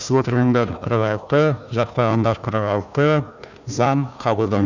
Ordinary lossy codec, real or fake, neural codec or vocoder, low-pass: none; fake; codec, 16 kHz, 1 kbps, FunCodec, trained on Chinese and English, 50 frames a second; 7.2 kHz